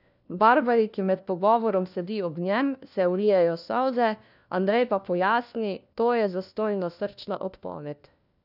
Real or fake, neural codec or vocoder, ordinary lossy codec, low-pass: fake; codec, 16 kHz, 1 kbps, FunCodec, trained on LibriTTS, 50 frames a second; none; 5.4 kHz